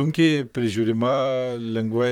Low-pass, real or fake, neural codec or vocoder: 19.8 kHz; fake; vocoder, 44.1 kHz, 128 mel bands, Pupu-Vocoder